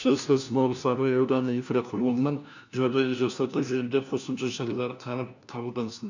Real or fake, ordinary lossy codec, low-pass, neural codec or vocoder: fake; none; 7.2 kHz; codec, 16 kHz, 1 kbps, FunCodec, trained on LibriTTS, 50 frames a second